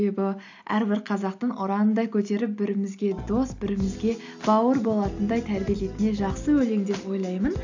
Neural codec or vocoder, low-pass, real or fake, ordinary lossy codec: none; 7.2 kHz; real; AAC, 48 kbps